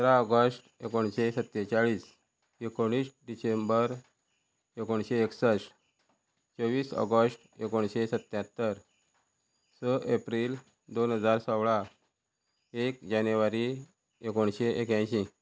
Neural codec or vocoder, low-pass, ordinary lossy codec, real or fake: none; none; none; real